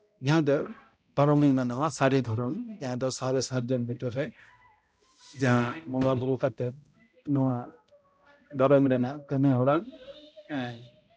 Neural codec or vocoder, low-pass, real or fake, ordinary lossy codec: codec, 16 kHz, 0.5 kbps, X-Codec, HuBERT features, trained on balanced general audio; none; fake; none